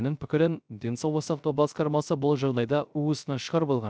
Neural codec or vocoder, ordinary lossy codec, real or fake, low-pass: codec, 16 kHz, 0.3 kbps, FocalCodec; none; fake; none